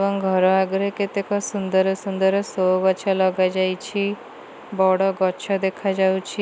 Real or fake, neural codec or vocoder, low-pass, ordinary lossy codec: real; none; none; none